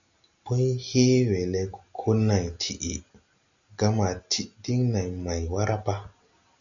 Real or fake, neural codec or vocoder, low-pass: real; none; 7.2 kHz